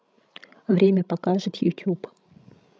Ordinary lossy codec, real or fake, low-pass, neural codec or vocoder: none; fake; none; codec, 16 kHz, 16 kbps, FreqCodec, larger model